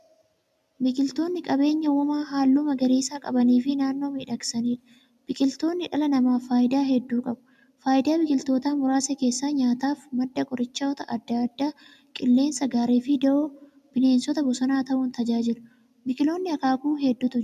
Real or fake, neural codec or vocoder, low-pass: real; none; 14.4 kHz